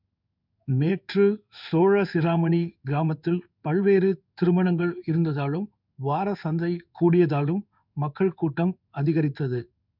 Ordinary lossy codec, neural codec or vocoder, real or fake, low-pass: none; codec, 16 kHz in and 24 kHz out, 1 kbps, XY-Tokenizer; fake; 5.4 kHz